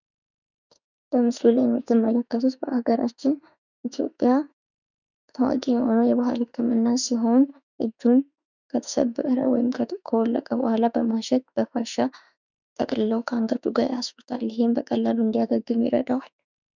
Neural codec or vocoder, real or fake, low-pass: autoencoder, 48 kHz, 32 numbers a frame, DAC-VAE, trained on Japanese speech; fake; 7.2 kHz